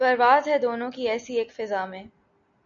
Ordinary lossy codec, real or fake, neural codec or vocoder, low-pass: MP3, 64 kbps; real; none; 7.2 kHz